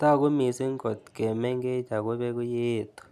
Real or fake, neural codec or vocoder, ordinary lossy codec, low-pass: real; none; none; 14.4 kHz